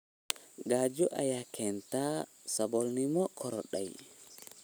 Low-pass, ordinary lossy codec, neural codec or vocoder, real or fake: none; none; none; real